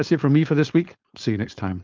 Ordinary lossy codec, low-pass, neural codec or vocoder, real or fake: Opus, 24 kbps; 7.2 kHz; codec, 16 kHz, 4.8 kbps, FACodec; fake